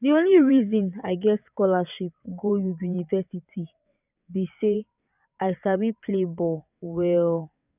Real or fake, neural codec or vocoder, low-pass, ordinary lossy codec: fake; vocoder, 22.05 kHz, 80 mel bands, WaveNeXt; 3.6 kHz; none